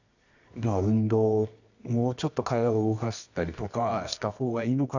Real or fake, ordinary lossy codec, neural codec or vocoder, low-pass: fake; none; codec, 24 kHz, 0.9 kbps, WavTokenizer, medium music audio release; 7.2 kHz